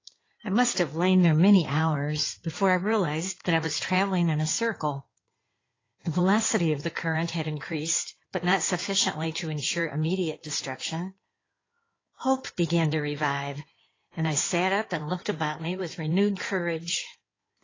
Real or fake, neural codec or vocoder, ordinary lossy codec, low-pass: fake; codec, 16 kHz in and 24 kHz out, 2.2 kbps, FireRedTTS-2 codec; AAC, 32 kbps; 7.2 kHz